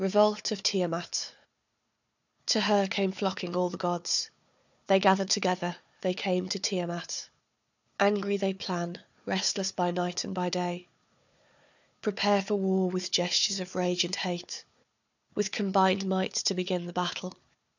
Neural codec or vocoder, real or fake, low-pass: codec, 16 kHz, 4 kbps, FunCodec, trained on Chinese and English, 50 frames a second; fake; 7.2 kHz